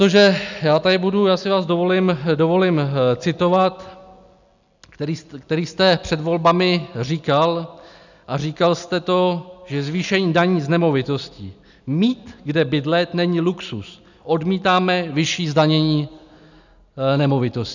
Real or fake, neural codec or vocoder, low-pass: real; none; 7.2 kHz